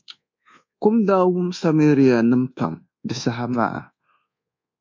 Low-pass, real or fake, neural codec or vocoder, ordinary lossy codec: 7.2 kHz; fake; codec, 24 kHz, 1.2 kbps, DualCodec; MP3, 48 kbps